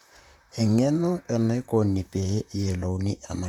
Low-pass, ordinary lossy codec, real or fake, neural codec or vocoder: 19.8 kHz; none; fake; vocoder, 44.1 kHz, 128 mel bands, Pupu-Vocoder